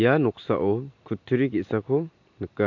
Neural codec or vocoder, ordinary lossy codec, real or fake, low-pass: none; MP3, 48 kbps; real; 7.2 kHz